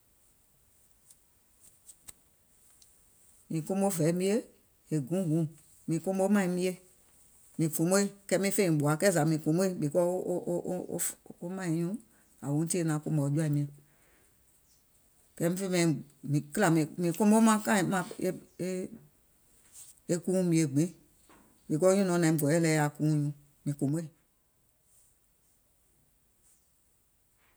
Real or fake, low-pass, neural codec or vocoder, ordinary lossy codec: real; none; none; none